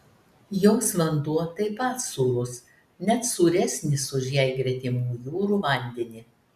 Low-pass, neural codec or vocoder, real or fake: 14.4 kHz; none; real